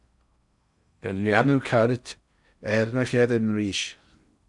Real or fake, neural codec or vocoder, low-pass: fake; codec, 16 kHz in and 24 kHz out, 0.6 kbps, FocalCodec, streaming, 4096 codes; 10.8 kHz